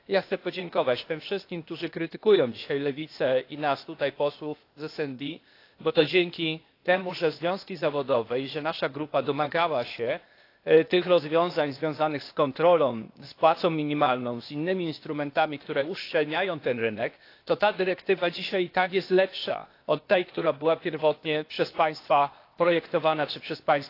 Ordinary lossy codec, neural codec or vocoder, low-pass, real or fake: AAC, 32 kbps; codec, 16 kHz, 0.8 kbps, ZipCodec; 5.4 kHz; fake